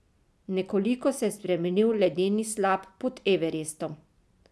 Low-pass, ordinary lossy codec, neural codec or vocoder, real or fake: none; none; none; real